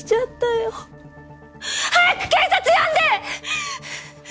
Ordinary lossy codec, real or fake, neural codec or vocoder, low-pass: none; real; none; none